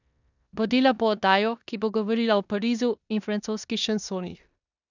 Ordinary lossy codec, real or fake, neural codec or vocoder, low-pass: none; fake; codec, 16 kHz in and 24 kHz out, 0.9 kbps, LongCat-Audio-Codec, four codebook decoder; 7.2 kHz